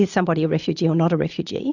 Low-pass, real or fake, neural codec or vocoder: 7.2 kHz; real; none